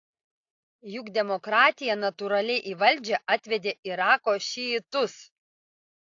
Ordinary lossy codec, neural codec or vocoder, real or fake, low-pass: AAC, 48 kbps; none; real; 7.2 kHz